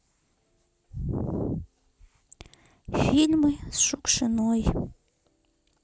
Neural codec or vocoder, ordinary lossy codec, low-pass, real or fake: none; none; none; real